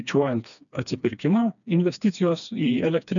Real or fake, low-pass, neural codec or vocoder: fake; 7.2 kHz; codec, 16 kHz, 2 kbps, FreqCodec, smaller model